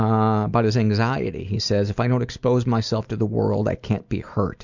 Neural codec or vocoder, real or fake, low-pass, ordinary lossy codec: none; real; 7.2 kHz; Opus, 64 kbps